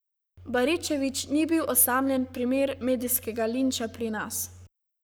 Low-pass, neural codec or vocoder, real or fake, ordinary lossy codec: none; codec, 44.1 kHz, 7.8 kbps, Pupu-Codec; fake; none